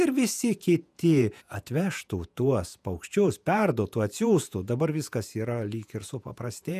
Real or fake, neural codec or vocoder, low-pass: fake; vocoder, 48 kHz, 128 mel bands, Vocos; 14.4 kHz